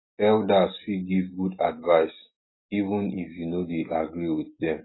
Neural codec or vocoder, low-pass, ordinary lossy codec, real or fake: none; 7.2 kHz; AAC, 16 kbps; real